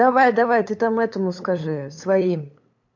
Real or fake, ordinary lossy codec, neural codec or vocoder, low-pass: fake; MP3, 48 kbps; codec, 16 kHz, 8 kbps, FunCodec, trained on LibriTTS, 25 frames a second; 7.2 kHz